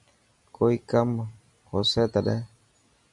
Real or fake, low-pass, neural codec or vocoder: real; 10.8 kHz; none